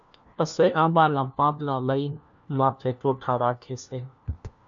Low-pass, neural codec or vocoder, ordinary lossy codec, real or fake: 7.2 kHz; codec, 16 kHz, 1 kbps, FunCodec, trained on LibriTTS, 50 frames a second; MP3, 64 kbps; fake